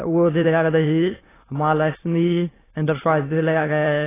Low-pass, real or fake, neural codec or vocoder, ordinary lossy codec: 3.6 kHz; fake; autoencoder, 22.05 kHz, a latent of 192 numbers a frame, VITS, trained on many speakers; AAC, 16 kbps